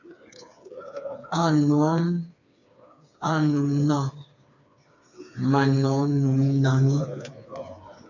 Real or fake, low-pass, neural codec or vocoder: fake; 7.2 kHz; codec, 16 kHz, 4 kbps, FreqCodec, smaller model